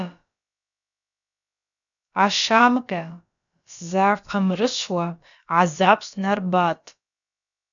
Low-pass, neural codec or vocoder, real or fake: 7.2 kHz; codec, 16 kHz, about 1 kbps, DyCAST, with the encoder's durations; fake